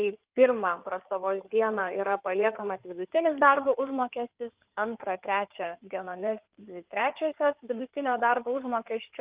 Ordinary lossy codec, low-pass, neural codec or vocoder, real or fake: Opus, 24 kbps; 3.6 kHz; codec, 16 kHz in and 24 kHz out, 2.2 kbps, FireRedTTS-2 codec; fake